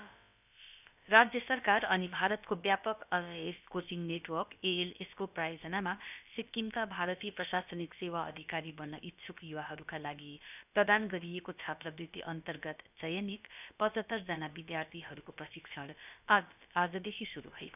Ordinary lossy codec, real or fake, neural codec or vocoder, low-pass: none; fake; codec, 16 kHz, about 1 kbps, DyCAST, with the encoder's durations; 3.6 kHz